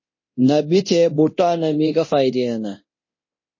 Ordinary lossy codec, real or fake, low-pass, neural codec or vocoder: MP3, 32 kbps; fake; 7.2 kHz; codec, 24 kHz, 0.9 kbps, DualCodec